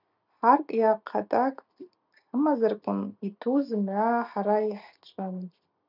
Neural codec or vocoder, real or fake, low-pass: none; real; 5.4 kHz